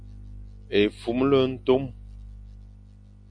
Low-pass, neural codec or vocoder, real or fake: 9.9 kHz; none; real